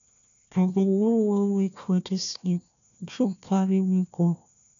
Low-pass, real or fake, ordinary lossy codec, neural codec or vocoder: 7.2 kHz; fake; none; codec, 16 kHz, 1 kbps, FunCodec, trained on Chinese and English, 50 frames a second